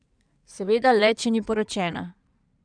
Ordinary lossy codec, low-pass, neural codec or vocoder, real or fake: none; 9.9 kHz; codec, 16 kHz in and 24 kHz out, 2.2 kbps, FireRedTTS-2 codec; fake